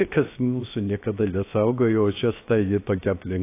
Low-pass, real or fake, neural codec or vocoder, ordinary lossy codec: 3.6 kHz; fake; codec, 16 kHz, 0.7 kbps, FocalCodec; AAC, 24 kbps